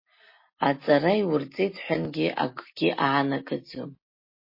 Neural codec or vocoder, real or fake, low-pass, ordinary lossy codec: none; real; 5.4 kHz; MP3, 24 kbps